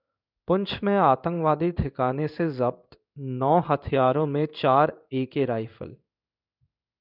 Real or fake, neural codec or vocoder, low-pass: fake; codec, 16 kHz in and 24 kHz out, 1 kbps, XY-Tokenizer; 5.4 kHz